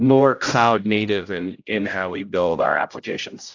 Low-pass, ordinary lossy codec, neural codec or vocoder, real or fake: 7.2 kHz; AAC, 48 kbps; codec, 16 kHz, 0.5 kbps, X-Codec, HuBERT features, trained on general audio; fake